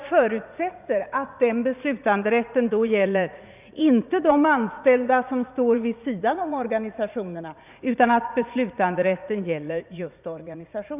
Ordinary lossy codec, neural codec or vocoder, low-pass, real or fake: none; vocoder, 44.1 kHz, 80 mel bands, Vocos; 3.6 kHz; fake